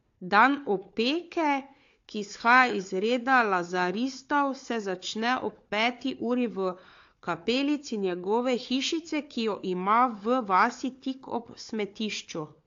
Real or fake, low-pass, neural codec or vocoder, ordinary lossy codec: fake; 7.2 kHz; codec, 16 kHz, 4 kbps, FunCodec, trained on Chinese and English, 50 frames a second; AAC, 48 kbps